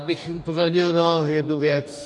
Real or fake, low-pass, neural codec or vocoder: fake; 10.8 kHz; codec, 44.1 kHz, 2.6 kbps, DAC